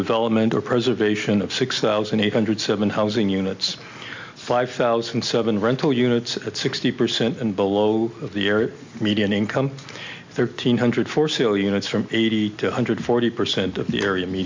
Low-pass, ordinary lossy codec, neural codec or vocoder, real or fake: 7.2 kHz; MP3, 64 kbps; none; real